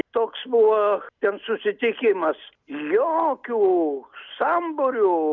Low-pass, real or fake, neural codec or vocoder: 7.2 kHz; real; none